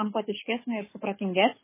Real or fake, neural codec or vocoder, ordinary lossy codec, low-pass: fake; codec, 24 kHz, 6 kbps, HILCodec; MP3, 16 kbps; 3.6 kHz